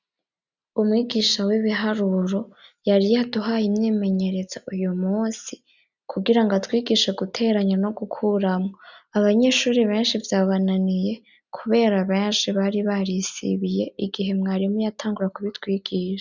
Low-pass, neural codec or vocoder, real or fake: 7.2 kHz; none; real